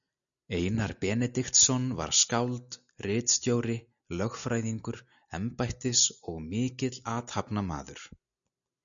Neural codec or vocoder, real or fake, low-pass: none; real; 7.2 kHz